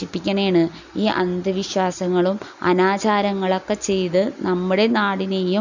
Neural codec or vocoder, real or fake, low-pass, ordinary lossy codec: none; real; 7.2 kHz; none